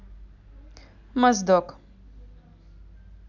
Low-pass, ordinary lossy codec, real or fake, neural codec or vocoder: 7.2 kHz; none; real; none